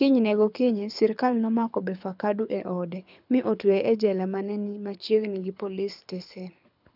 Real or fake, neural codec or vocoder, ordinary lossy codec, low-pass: fake; codec, 24 kHz, 6 kbps, HILCodec; none; 5.4 kHz